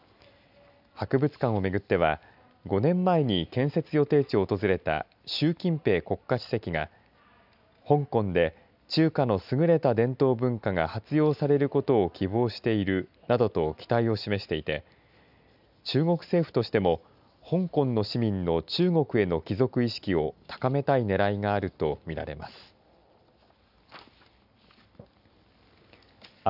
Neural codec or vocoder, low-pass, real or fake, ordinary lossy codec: none; 5.4 kHz; real; none